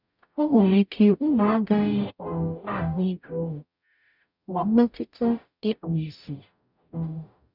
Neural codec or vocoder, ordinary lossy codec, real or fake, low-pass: codec, 44.1 kHz, 0.9 kbps, DAC; none; fake; 5.4 kHz